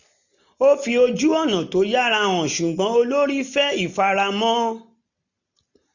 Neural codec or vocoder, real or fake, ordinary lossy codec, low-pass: none; real; none; 7.2 kHz